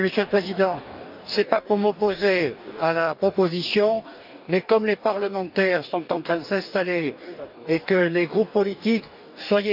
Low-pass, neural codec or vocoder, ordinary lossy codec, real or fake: 5.4 kHz; codec, 44.1 kHz, 2.6 kbps, DAC; none; fake